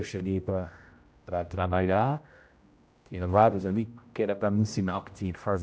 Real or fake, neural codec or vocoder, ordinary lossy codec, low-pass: fake; codec, 16 kHz, 0.5 kbps, X-Codec, HuBERT features, trained on general audio; none; none